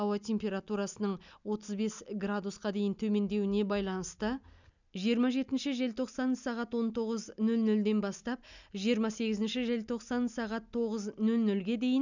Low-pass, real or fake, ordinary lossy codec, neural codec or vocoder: 7.2 kHz; real; none; none